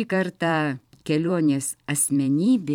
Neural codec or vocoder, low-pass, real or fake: vocoder, 44.1 kHz, 128 mel bands every 512 samples, BigVGAN v2; 19.8 kHz; fake